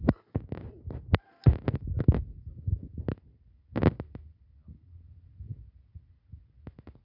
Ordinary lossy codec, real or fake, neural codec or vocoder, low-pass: none; real; none; 5.4 kHz